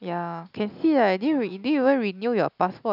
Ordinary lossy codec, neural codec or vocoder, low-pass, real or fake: none; none; 5.4 kHz; real